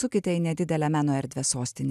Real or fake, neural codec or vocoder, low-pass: real; none; 14.4 kHz